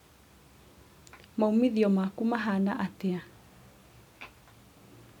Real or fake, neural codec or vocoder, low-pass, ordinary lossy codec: real; none; 19.8 kHz; none